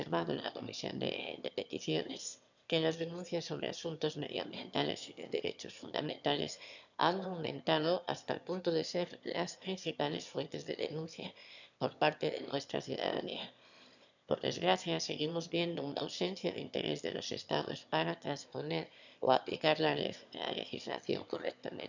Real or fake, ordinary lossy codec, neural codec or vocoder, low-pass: fake; none; autoencoder, 22.05 kHz, a latent of 192 numbers a frame, VITS, trained on one speaker; 7.2 kHz